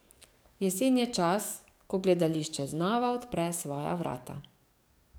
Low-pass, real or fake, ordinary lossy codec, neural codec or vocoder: none; fake; none; codec, 44.1 kHz, 7.8 kbps, DAC